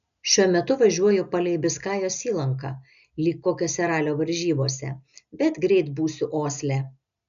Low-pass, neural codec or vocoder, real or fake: 7.2 kHz; none; real